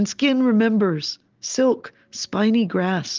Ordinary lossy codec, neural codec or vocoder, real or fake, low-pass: Opus, 24 kbps; none; real; 7.2 kHz